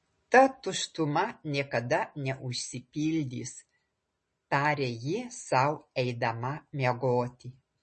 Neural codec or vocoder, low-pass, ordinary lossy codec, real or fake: none; 10.8 kHz; MP3, 32 kbps; real